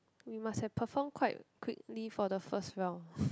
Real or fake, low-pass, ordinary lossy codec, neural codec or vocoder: real; none; none; none